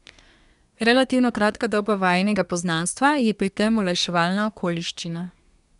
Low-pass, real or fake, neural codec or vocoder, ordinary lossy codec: 10.8 kHz; fake; codec, 24 kHz, 1 kbps, SNAC; none